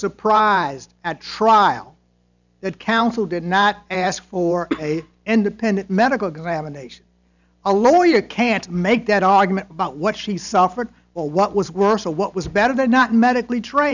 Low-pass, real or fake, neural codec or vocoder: 7.2 kHz; fake; vocoder, 44.1 kHz, 128 mel bands every 512 samples, BigVGAN v2